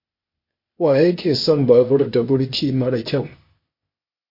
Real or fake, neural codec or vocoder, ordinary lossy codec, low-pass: fake; codec, 16 kHz, 0.8 kbps, ZipCodec; MP3, 32 kbps; 5.4 kHz